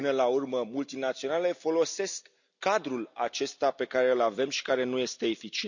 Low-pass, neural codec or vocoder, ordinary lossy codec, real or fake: 7.2 kHz; none; none; real